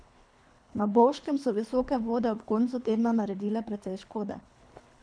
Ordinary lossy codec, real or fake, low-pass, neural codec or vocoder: MP3, 96 kbps; fake; 9.9 kHz; codec, 24 kHz, 3 kbps, HILCodec